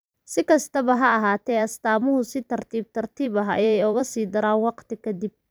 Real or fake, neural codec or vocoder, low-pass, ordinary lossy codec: fake; vocoder, 44.1 kHz, 128 mel bands every 256 samples, BigVGAN v2; none; none